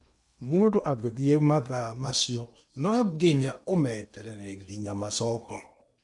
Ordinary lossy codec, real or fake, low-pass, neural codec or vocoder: none; fake; 10.8 kHz; codec, 16 kHz in and 24 kHz out, 0.8 kbps, FocalCodec, streaming, 65536 codes